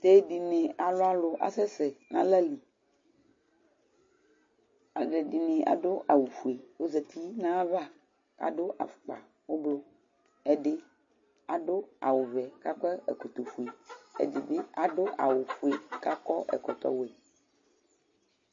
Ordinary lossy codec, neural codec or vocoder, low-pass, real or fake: MP3, 32 kbps; none; 7.2 kHz; real